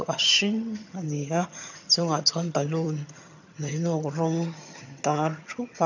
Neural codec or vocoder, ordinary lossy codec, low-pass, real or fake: vocoder, 22.05 kHz, 80 mel bands, HiFi-GAN; none; 7.2 kHz; fake